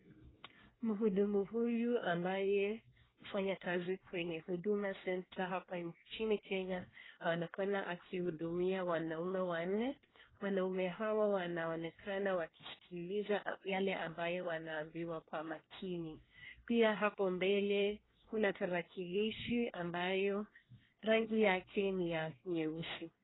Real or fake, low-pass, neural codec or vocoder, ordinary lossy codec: fake; 7.2 kHz; codec, 24 kHz, 1 kbps, SNAC; AAC, 16 kbps